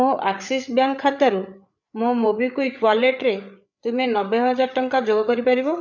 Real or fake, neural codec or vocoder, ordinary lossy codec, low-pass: fake; codec, 16 kHz, 8 kbps, FreqCodec, larger model; none; 7.2 kHz